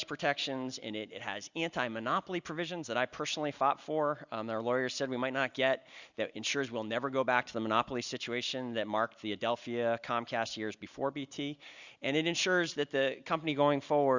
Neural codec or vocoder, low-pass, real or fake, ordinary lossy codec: none; 7.2 kHz; real; Opus, 64 kbps